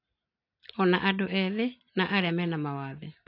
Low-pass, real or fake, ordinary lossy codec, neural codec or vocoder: 5.4 kHz; real; AAC, 32 kbps; none